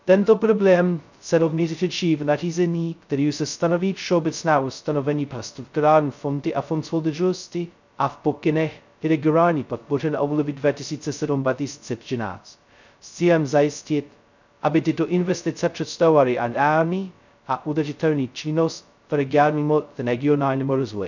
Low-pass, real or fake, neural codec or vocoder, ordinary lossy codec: 7.2 kHz; fake; codec, 16 kHz, 0.2 kbps, FocalCodec; none